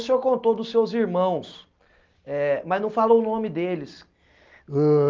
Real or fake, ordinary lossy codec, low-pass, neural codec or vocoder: real; Opus, 24 kbps; 7.2 kHz; none